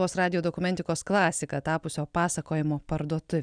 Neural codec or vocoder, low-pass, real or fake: none; 9.9 kHz; real